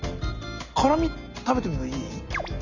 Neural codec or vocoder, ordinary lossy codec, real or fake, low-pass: none; none; real; 7.2 kHz